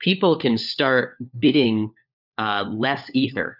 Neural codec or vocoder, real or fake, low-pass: codec, 16 kHz, 4 kbps, FunCodec, trained on LibriTTS, 50 frames a second; fake; 5.4 kHz